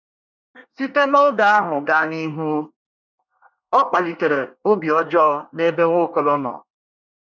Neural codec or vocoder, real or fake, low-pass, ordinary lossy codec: codec, 24 kHz, 1 kbps, SNAC; fake; 7.2 kHz; none